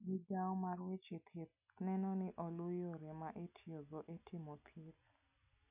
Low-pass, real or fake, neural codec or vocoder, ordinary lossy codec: 3.6 kHz; real; none; none